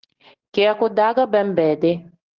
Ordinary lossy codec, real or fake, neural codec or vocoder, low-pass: Opus, 16 kbps; real; none; 7.2 kHz